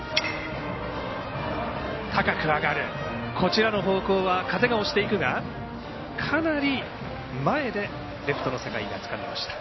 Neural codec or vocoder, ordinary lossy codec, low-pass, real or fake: none; MP3, 24 kbps; 7.2 kHz; real